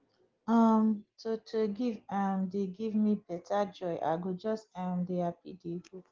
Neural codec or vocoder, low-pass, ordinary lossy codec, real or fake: none; 7.2 kHz; Opus, 16 kbps; real